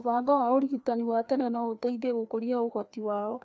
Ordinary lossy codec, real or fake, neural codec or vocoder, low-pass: none; fake; codec, 16 kHz, 2 kbps, FreqCodec, larger model; none